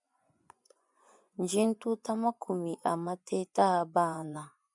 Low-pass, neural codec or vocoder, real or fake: 10.8 kHz; vocoder, 24 kHz, 100 mel bands, Vocos; fake